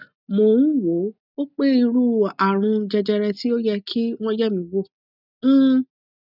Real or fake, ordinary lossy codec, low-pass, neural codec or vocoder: real; none; 5.4 kHz; none